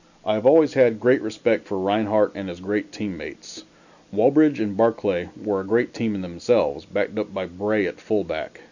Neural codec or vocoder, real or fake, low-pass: none; real; 7.2 kHz